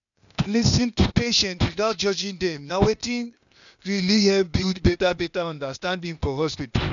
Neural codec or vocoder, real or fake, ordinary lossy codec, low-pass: codec, 16 kHz, 0.8 kbps, ZipCodec; fake; none; 7.2 kHz